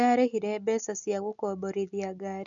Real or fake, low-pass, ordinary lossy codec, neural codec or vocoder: real; 7.2 kHz; none; none